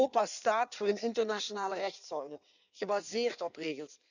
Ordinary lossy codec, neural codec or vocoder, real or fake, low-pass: none; codec, 16 kHz in and 24 kHz out, 1.1 kbps, FireRedTTS-2 codec; fake; 7.2 kHz